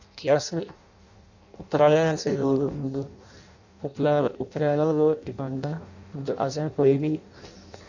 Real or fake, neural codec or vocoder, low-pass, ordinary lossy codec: fake; codec, 16 kHz in and 24 kHz out, 0.6 kbps, FireRedTTS-2 codec; 7.2 kHz; none